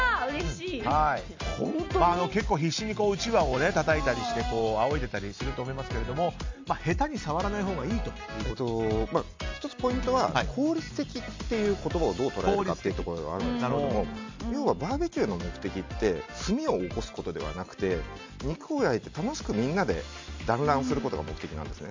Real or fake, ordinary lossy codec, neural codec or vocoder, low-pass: real; MP3, 64 kbps; none; 7.2 kHz